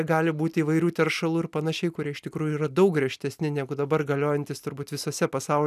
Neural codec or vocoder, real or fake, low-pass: none; real; 14.4 kHz